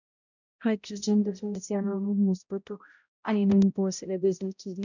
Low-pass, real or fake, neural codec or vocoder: 7.2 kHz; fake; codec, 16 kHz, 0.5 kbps, X-Codec, HuBERT features, trained on balanced general audio